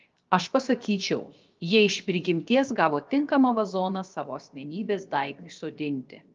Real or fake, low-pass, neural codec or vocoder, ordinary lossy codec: fake; 7.2 kHz; codec, 16 kHz, 0.7 kbps, FocalCodec; Opus, 24 kbps